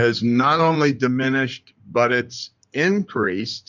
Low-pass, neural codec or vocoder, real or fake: 7.2 kHz; codec, 16 kHz in and 24 kHz out, 2.2 kbps, FireRedTTS-2 codec; fake